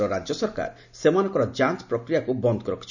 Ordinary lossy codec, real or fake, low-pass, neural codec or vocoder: none; real; 7.2 kHz; none